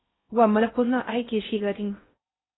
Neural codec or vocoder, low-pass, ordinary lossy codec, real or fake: codec, 16 kHz in and 24 kHz out, 0.6 kbps, FocalCodec, streaming, 4096 codes; 7.2 kHz; AAC, 16 kbps; fake